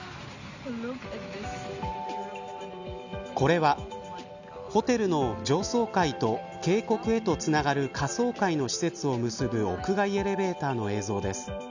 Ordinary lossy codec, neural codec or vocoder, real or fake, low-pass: none; none; real; 7.2 kHz